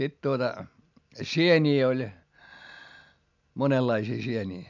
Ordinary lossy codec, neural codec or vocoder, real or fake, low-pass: MP3, 64 kbps; none; real; 7.2 kHz